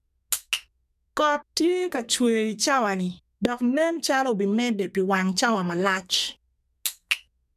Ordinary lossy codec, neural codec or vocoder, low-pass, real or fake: none; codec, 32 kHz, 1.9 kbps, SNAC; 14.4 kHz; fake